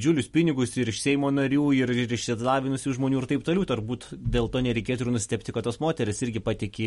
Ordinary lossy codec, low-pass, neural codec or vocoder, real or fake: MP3, 48 kbps; 19.8 kHz; none; real